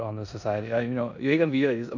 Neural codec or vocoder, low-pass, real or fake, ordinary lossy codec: codec, 16 kHz in and 24 kHz out, 0.9 kbps, LongCat-Audio-Codec, four codebook decoder; 7.2 kHz; fake; none